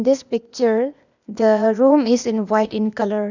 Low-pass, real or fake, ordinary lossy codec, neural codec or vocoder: 7.2 kHz; fake; none; codec, 16 kHz, 0.8 kbps, ZipCodec